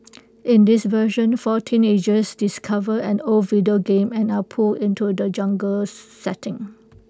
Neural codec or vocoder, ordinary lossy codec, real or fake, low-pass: none; none; real; none